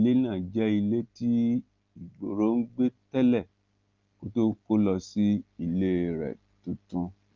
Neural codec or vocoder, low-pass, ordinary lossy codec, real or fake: none; 7.2 kHz; Opus, 32 kbps; real